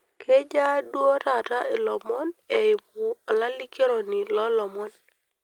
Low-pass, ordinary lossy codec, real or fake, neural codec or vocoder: 19.8 kHz; Opus, 32 kbps; real; none